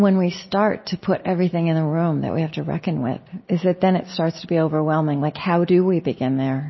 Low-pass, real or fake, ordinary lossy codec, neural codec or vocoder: 7.2 kHz; real; MP3, 24 kbps; none